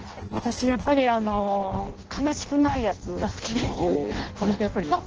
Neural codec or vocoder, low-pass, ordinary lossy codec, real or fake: codec, 16 kHz in and 24 kHz out, 0.6 kbps, FireRedTTS-2 codec; 7.2 kHz; Opus, 16 kbps; fake